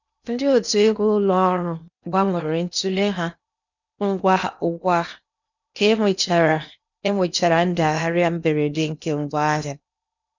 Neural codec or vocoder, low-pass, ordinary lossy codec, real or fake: codec, 16 kHz in and 24 kHz out, 0.8 kbps, FocalCodec, streaming, 65536 codes; 7.2 kHz; none; fake